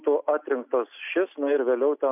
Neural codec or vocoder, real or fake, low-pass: none; real; 3.6 kHz